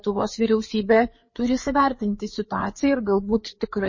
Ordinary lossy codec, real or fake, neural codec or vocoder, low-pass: MP3, 32 kbps; fake; codec, 16 kHz, 4 kbps, FreqCodec, larger model; 7.2 kHz